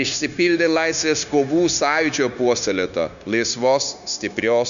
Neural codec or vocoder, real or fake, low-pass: codec, 16 kHz, 0.9 kbps, LongCat-Audio-Codec; fake; 7.2 kHz